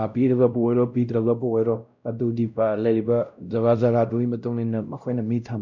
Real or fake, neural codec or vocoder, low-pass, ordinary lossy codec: fake; codec, 16 kHz, 0.5 kbps, X-Codec, WavLM features, trained on Multilingual LibriSpeech; 7.2 kHz; none